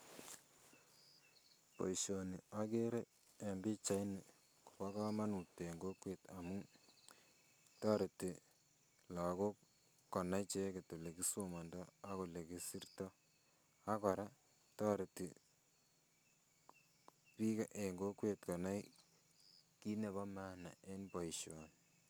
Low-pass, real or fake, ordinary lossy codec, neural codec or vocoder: none; real; none; none